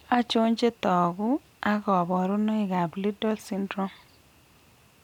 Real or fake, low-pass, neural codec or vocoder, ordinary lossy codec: real; 19.8 kHz; none; none